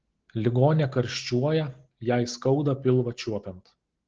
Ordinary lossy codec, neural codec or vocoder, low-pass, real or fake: Opus, 16 kbps; none; 7.2 kHz; real